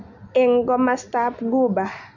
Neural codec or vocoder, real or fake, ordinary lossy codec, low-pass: none; real; none; 7.2 kHz